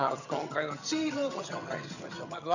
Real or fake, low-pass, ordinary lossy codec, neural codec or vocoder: fake; 7.2 kHz; none; vocoder, 22.05 kHz, 80 mel bands, HiFi-GAN